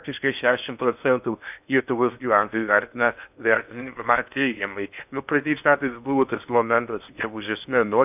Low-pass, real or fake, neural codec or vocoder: 3.6 kHz; fake; codec, 16 kHz in and 24 kHz out, 0.6 kbps, FocalCodec, streaming, 4096 codes